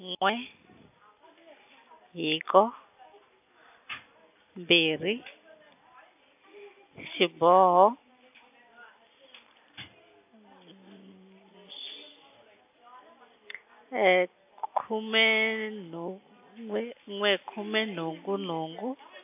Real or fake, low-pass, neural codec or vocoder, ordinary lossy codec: real; 3.6 kHz; none; AAC, 32 kbps